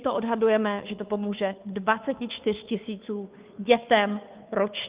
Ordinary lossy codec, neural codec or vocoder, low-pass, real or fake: Opus, 32 kbps; codec, 16 kHz, 2 kbps, FunCodec, trained on Chinese and English, 25 frames a second; 3.6 kHz; fake